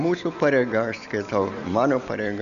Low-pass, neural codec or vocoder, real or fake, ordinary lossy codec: 7.2 kHz; none; real; Opus, 64 kbps